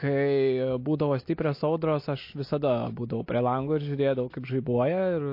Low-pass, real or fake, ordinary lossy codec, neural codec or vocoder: 5.4 kHz; real; MP3, 32 kbps; none